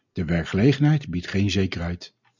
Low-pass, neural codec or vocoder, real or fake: 7.2 kHz; none; real